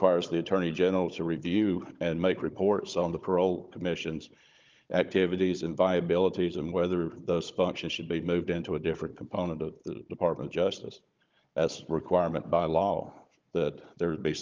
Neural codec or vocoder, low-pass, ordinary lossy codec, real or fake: codec, 16 kHz, 16 kbps, FunCodec, trained on Chinese and English, 50 frames a second; 7.2 kHz; Opus, 24 kbps; fake